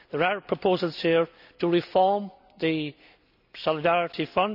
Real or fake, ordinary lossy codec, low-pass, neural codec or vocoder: real; none; 5.4 kHz; none